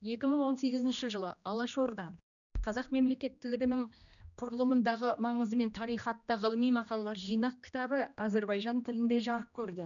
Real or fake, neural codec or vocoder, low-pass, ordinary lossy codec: fake; codec, 16 kHz, 1 kbps, X-Codec, HuBERT features, trained on general audio; 7.2 kHz; none